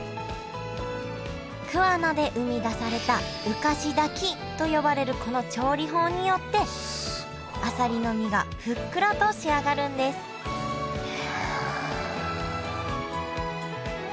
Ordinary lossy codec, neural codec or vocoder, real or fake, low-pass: none; none; real; none